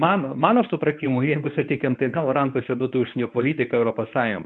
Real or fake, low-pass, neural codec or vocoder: fake; 10.8 kHz; codec, 24 kHz, 0.9 kbps, WavTokenizer, medium speech release version 1